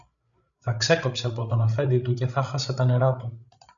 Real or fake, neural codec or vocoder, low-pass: fake; codec, 16 kHz, 8 kbps, FreqCodec, larger model; 7.2 kHz